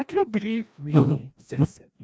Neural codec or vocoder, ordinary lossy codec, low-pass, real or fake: codec, 16 kHz, 1 kbps, FreqCodec, larger model; none; none; fake